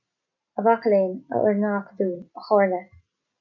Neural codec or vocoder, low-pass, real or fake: none; 7.2 kHz; real